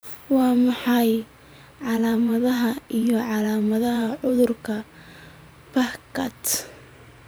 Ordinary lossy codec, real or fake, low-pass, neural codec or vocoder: none; fake; none; vocoder, 44.1 kHz, 128 mel bands every 512 samples, BigVGAN v2